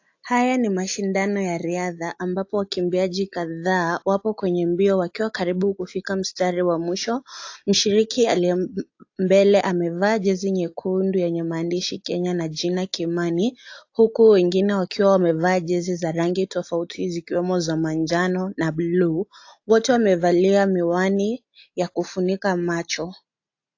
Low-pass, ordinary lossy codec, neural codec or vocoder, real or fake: 7.2 kHz; AAC, 48 kbps; none; real